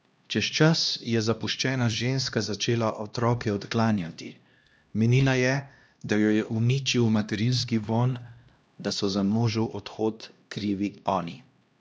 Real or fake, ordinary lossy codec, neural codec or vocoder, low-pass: fake; none; codec, 16 kHz, 1 kbps, X-Codec, HuBERT features, trained on LibriSpeech; none